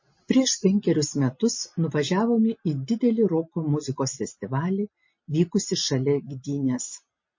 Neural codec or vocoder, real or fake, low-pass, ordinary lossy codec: none; real; 7.2 kHz; MP3, 32 kbps